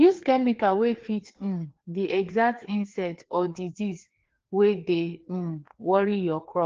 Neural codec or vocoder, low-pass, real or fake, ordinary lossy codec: codec, 16 kHz, 2 kbps, FreqCodec, larger model; 7.2 kHz; fake; Opus, 16 kbps